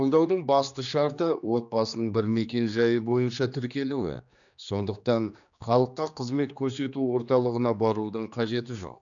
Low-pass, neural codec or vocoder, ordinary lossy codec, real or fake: 7.2 kHz; codec, 16 kHz, 2 kbps, X-Codec, HuBERT features, trained on general audio; none; fake